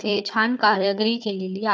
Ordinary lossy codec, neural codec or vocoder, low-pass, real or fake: none; codec, 16 kHz, 4 kbps, FunCodec, trained on Chinese and English, 50 frames a second; none; fake